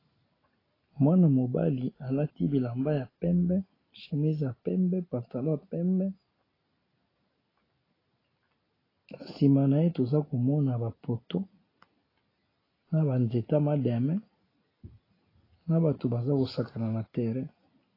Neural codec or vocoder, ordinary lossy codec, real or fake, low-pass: none; AAC, 24 kbps; real; 5.4 kHz